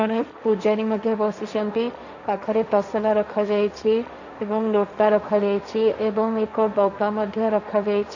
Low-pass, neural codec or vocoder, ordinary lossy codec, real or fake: 7.2 kHz; codec, 16 kHz, 1.1 kbps, Voila-Tokenizer; none; fake